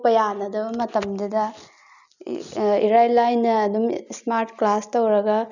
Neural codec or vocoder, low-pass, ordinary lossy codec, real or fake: none; 7.2 kHz; none; real